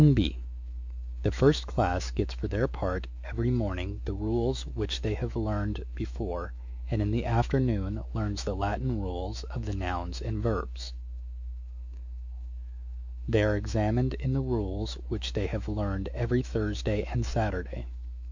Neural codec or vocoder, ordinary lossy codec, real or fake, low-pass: autoencoder, 48 kHz, 128 numbers a frame, DAC-VAE, trained on Japanese speech; MP3, 64 kbps; fake; 7.2 kHz